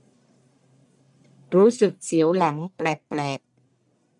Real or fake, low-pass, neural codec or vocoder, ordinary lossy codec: fake; 10.8 kHz; codec, 44.1 kHz, 1.7 kbps, Pupu-Codec; none